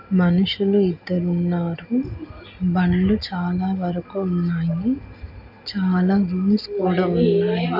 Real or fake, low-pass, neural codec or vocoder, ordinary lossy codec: real; 5.4 kHz; none; none